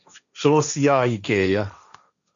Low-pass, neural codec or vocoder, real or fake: 7.2 kHz; codec, 16 kHz, 1.1 kbps, Voila-Tokenizer; fake